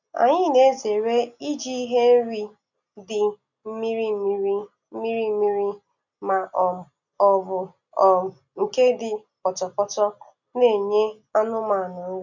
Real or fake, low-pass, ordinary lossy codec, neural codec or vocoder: real; 7.2 kHz; none; none